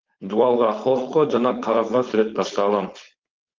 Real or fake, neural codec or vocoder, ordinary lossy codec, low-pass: fake; codec, 16 kHz, 4.8 kbps, FACodec; Opus, 24 kbps; 7.2 kHz